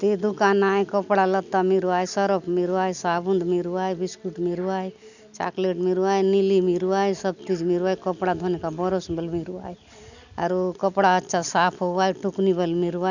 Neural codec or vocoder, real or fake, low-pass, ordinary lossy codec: none; real; 7.2 kHz; none